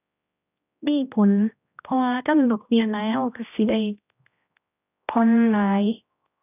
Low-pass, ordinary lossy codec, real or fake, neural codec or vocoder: 3.6 kHz; none; fake; codec, 16 kHz, 1 kbps, X-Codec, HuBERT features, trained on general audio